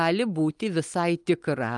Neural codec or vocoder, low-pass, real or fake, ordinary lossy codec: none; 10.8 kHz; real; Opus, 32 kbps